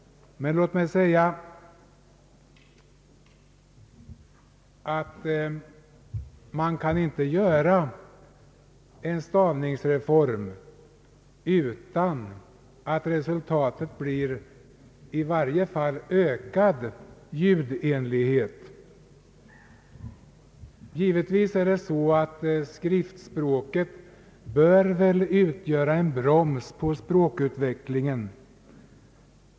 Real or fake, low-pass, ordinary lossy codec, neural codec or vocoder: real; none; none; none